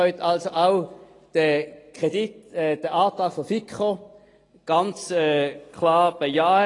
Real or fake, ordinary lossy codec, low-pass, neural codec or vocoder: real; AAC, 32 kbps; 10.8 kHz; none